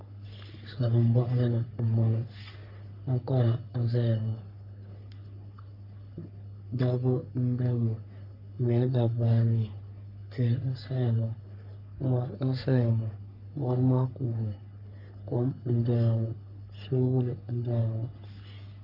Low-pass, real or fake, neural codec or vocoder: 5.4 kHz; fake; codec, 44.1 kHz, 3.4 kbps, Pupu-Codec